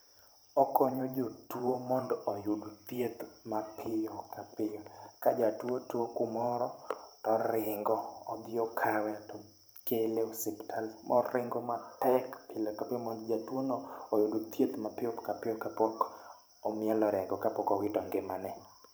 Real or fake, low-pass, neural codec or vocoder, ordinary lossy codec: fake; none; vocoder, 44.1 kHz, 128 mel bands every 512 samples, BigVGAN v2; none